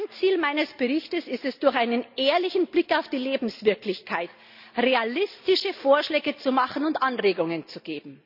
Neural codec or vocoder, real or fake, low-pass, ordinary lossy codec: none; real; 5.4 kHz; none